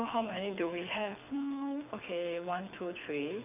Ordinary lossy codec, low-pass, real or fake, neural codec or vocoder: none; 3.6 kHz; fake; codec, 24 kHz, 6 kbps, HILCodec